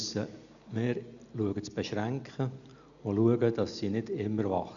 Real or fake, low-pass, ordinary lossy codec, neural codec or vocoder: real; 7.2 kHz; none; none